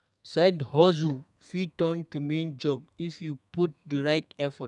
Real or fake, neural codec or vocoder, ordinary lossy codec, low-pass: fake; codec, 32 kHz, 1.9 kbps, SNAC; none; 10.8 kHz